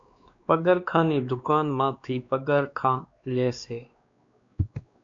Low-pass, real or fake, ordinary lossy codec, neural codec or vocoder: 7.2 kHz; fake; MP3, 64 kbps; codec, 16 kHz, 2 kbps, X-Codec, WavLM features, trained on Multilingual LibriSpeech